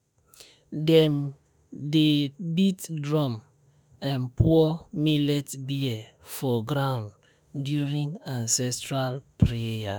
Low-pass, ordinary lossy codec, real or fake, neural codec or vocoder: none; none; fake; autoencoder, 48 kHz, 32 numbers a frame, DAC-VAE, trained on Japanese speech